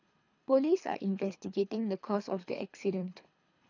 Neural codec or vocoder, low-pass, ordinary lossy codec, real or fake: codec, 24 kHz, 3 kbps, HILCodec; 7.2 kHz; none; fake